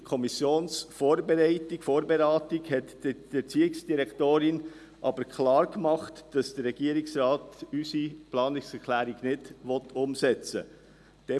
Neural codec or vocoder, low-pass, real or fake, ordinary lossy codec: none; none; real; none